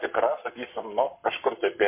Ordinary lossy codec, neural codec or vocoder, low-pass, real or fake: MP3, 24 kbps; codec, 44.1 kHz, 7.8 kbps, Pupu-Codec; 3.6 kHz; fake